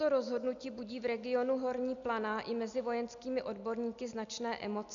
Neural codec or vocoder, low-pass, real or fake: none; 7.2 kHz; real